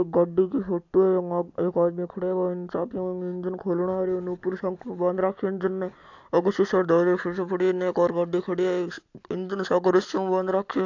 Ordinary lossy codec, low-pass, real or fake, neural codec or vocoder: AAC, 48 kbps; 7.2 kHz; real; none